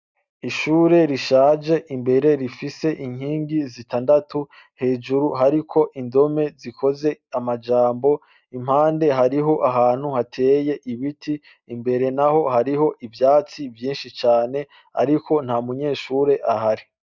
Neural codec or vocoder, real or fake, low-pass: none; real; 7.2 kHz